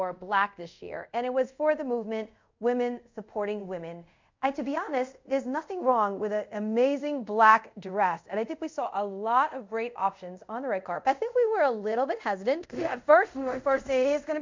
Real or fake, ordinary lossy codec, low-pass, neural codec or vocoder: fake; MP3, 64 kbps; 7.2 kHz; codec, 24 kHz, 0.5 kbps, DualCodec